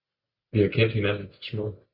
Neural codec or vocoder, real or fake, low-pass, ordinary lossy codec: none; real; 5.4 kHz; Opus, 64 kbps